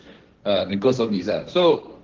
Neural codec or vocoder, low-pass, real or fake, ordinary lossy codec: codec, 16 kHz, 1.1 kbps, Voila-Tokenizer; 7.2 kHz; fake; Opus, 16 kbps